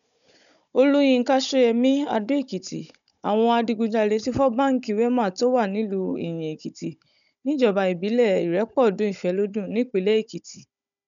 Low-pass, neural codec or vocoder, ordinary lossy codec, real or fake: 7.2 kHz; codec, 16 kHz, 16 kbps, FunCodec, trained on Chinese and English, 50 frames a second; none; fake